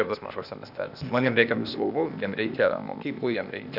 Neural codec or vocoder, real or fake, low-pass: codec, 16 kHz, 0.8 kbps, ZipCodec; fake; 5.4 kHz